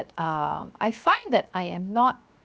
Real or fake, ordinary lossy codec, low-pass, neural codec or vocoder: fake; none; none; codec, 16 kHz, 0.7 kbps, FocalCodec